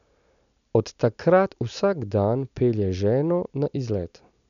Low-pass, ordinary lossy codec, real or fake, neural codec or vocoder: 7.2 kHz; none; real; none